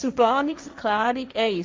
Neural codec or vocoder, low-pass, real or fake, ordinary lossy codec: codec, 16 kHz, 1.1 kbps, Voila-Tokenizer; none; fake; none